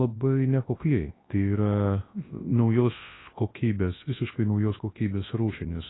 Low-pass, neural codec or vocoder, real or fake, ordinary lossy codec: 7.2 kHz; codec, 24 kHz, 0.9 kbps, WavTokenizer, large speech release; fake; AAC, 16 kbps